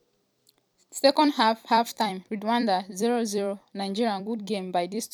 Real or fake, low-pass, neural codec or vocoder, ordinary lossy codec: fake; 19.8 kHz; vocoder, 44.1 kHz, 128 mel bands every 256 samples, BigVGAN v2; none